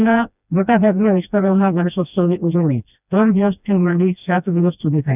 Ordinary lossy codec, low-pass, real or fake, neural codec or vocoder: none; 3.6 kHz; fake; codec, 16 kHz, 1 kbps, FreqCodec, smaller model